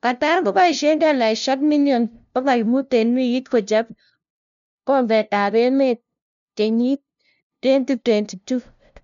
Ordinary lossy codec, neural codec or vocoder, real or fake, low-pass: none; codec, 16 kHz, 0.5 kbps, FunCodec, trained on LibriTTS, 25 frames a second; fake; 7.2 kHz